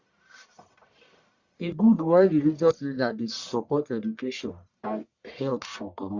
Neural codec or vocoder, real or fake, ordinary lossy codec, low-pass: codec, 44.1 kHz, 1.7 kbps, Pupu-Codec; fake; Opus, 64 kbps; 7.2 kHz